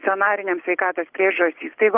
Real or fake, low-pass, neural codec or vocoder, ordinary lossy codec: real; 3.6 kHz; none; Opus, 32 kbps